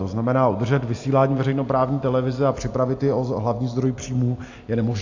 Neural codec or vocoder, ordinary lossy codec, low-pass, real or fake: none; AAC, 48 kbps; 7.2 kHz; real